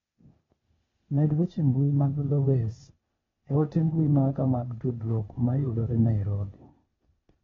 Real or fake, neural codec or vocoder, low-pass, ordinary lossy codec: fake; codec, 16 kHz, 0.8 kbps, ZipCodec; 7.2 kHz; AAC, 24 kbps